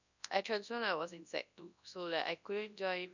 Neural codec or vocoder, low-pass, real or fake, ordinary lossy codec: codec, 24 kHz, 0.9 kbps, WavTokenizer, large speech release; 7.2 kHz; fake; none